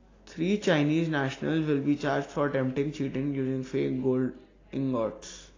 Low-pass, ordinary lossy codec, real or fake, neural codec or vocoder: 7.2 kHz; AAC, 32 kbps; real; none